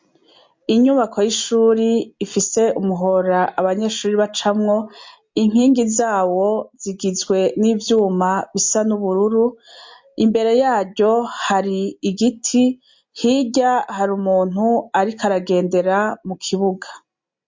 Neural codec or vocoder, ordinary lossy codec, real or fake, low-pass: none; MP3, 48 kbps; real; 7.2 kHz